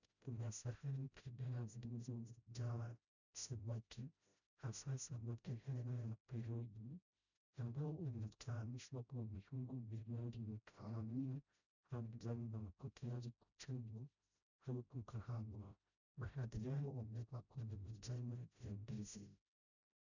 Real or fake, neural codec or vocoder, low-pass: fake; codec, 16 kHz, 0.5 kbps, FreqCodec, smaller model; 7.2 kHz